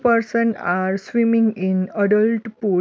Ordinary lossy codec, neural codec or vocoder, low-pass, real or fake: none; none; none; real